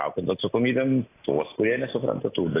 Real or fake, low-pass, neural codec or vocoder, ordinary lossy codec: real; 3.6 kHz; none; AAC, 16 kbps